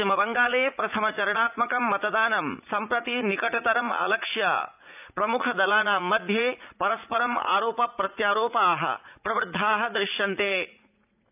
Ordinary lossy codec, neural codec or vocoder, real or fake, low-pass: none; vocoder, 44.1 kHz, 80 mel bands, Vocos; fake; 3.6 kHz